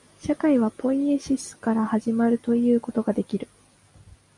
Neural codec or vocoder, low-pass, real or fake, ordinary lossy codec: none; 10.8 kHz; real; MP3, 96 kbps